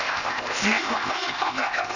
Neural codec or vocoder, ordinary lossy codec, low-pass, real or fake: codec, 16 kHz, 0.8 kbps, ZipCodec; none; 7.2 kHz; fake